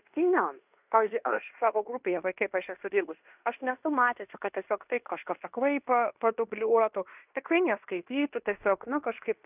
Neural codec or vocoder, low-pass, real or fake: codec, 16 kHz in and 24 kHz out, 0.9 kbps, LongCat-Audio-Codec, fine tuned four codebook decoder; 3.6 kHz; fake